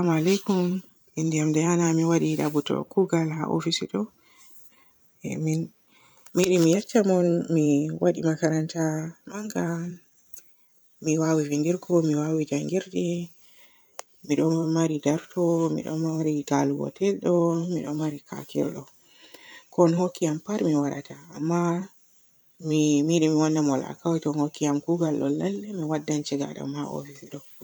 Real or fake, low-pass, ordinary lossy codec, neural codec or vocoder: fake; none; none; vocoder, 44.1 kHz, 128 mel bands every 512 samples, BigVGAN v2